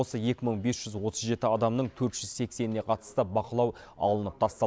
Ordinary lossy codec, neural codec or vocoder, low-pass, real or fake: none; none; none; real